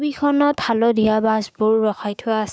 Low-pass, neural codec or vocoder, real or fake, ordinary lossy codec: none; codec, 16 kHz, 6 kbps, DAC; fake; none